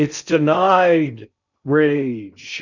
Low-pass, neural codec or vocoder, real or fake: 7.2 kHz; codec, 16 kHz in and 24 kHz out, 0.6 kbps, FocalCodec, streaming, 2048 codes; fake